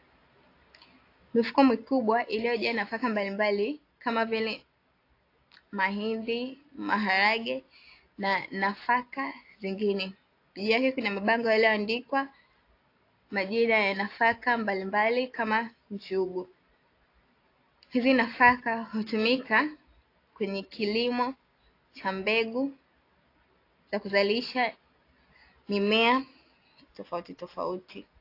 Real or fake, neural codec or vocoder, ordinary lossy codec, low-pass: real; none; AAC, 32 kbps; 5.4 kHz